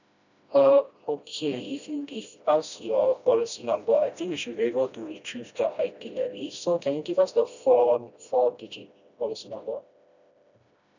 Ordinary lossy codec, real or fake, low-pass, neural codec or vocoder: none; fake; 7.2 kHz; codec, 16 kHz, 1 kbps, FreqCodec, smaller model